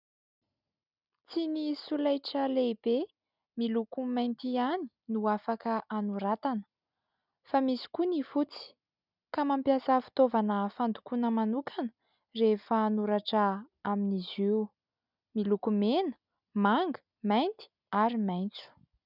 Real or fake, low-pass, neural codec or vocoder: real; 5.4 kHz; none